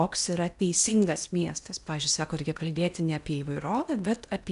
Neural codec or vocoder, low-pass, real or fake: codec, 16 kHz in and 24 kHz out, 0.8 kbps, FocalCodec, streaming, 65536 codes; 10.8 kHz; fake